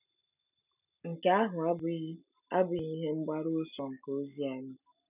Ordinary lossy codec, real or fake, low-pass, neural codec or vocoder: none; real; 3.6 kHz; none